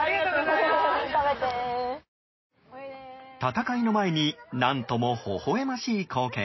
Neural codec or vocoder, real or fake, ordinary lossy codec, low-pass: none; real; MP3, 24 kbps; 7.2 kHz